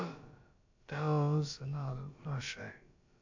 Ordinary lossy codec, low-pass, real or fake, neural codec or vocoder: AAC, 48 kbps; 7.2 kHz; fake; codec, 16 kHz, about 1 kbps, DyCAST, with the encoder's durations